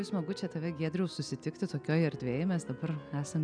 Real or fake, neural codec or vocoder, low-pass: real; none; 9.9 kHz